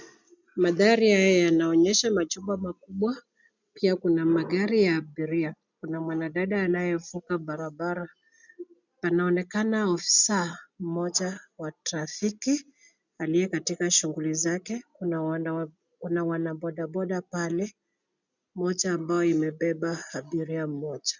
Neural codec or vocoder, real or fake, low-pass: none; real; 7.2 kHz